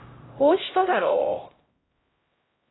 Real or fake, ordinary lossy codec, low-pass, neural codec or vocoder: fake; AAC, 16 kbps; 7.2 kHz; codec, 16 kHz, 0.5 kbps, X-Codec, HuBERT features, trained on LibriSpeech